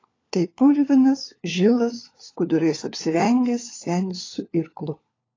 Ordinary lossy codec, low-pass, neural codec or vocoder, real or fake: AAC, 32 kbps; 7.2 kHz; codec, 16 kHz, 4 kbps, FunCodec, trained on LibriTTS, 50 frames a second; fake